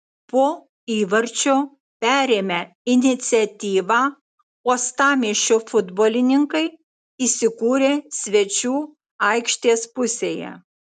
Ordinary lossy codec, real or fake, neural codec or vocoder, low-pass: MP3, 96 kbps; real; none; 10.8 kHz